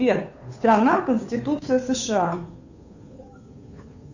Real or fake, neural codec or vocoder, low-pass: fake; codec, 16 kHz, 2 kbps, FunCodec, trained on Chinese and English, 25 frames a second; 7.2 kHz